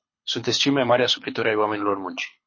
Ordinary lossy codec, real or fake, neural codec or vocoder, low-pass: MP3, 32 kbps; fake; codec, 24 kHz, 6 kbps, HILCodec; 7.2 kHz